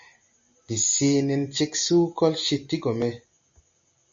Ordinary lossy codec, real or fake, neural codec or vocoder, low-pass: MP3, 48 kbps; real; none; 7.2 kHz